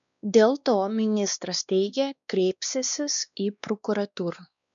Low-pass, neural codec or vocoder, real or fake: 7.2 kHz; codec, 16 kHz, 2 kbps, X-Codec, WavLM features, trained on Multilingual LibriSpeech; fake